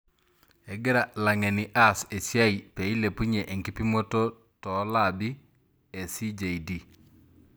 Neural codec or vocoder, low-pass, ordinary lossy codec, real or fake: none; none; none; real